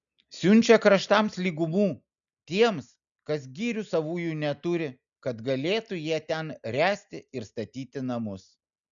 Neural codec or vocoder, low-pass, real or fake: none; 7.2 kHz; real